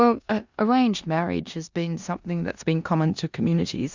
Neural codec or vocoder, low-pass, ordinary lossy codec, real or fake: codec, 16 kHz in and 24 kHz out, 0.9 kbps, LongCat-Audio-Codec, four codebook decoder; 7.2 kHz; Opus, 64 kbps; fake